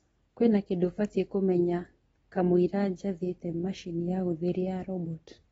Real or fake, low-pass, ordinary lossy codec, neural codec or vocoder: real; 19.8 kHz; AAC, 24 kbps; none